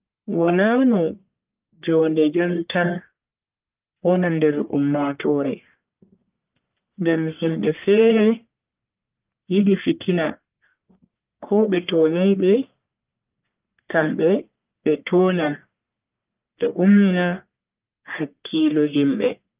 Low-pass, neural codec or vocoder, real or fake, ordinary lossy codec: 3.6 kHz; codec, 44.1 kHz, 1.7 kbps, Pupu-Codec; fake; Opus, 24 kbps